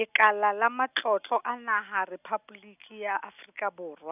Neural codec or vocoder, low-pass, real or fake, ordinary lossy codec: none; 3.6 kHz; real; none